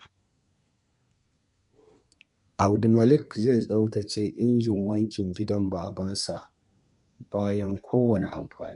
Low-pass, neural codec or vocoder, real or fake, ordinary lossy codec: 10.8 kHz; codec, 24 kHz, 1 kbps, SNAC; fake; none